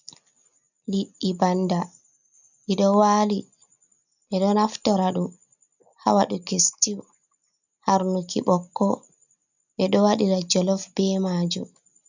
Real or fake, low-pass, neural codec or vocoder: real; 7.2 kHz; none